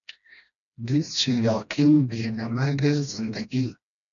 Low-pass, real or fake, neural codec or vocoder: 7.2 kHz; fake; codec, 16 kHz, 1 kbps, FreqCodec, smaller model